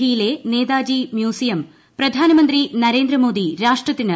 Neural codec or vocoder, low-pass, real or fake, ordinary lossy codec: none; none; real; none